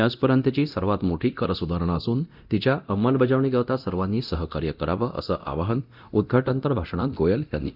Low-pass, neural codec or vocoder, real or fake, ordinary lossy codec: 5.4 kHz; codec, 24 kHz, 0.9 kbps, DualCodec; fake; none